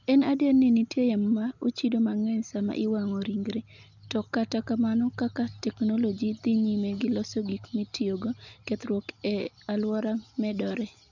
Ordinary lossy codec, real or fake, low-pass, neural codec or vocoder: none; real; 7.2 kHz; none